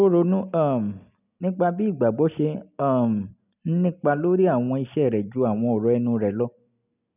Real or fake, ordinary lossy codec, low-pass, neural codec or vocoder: real; none; 3.6 kHz; none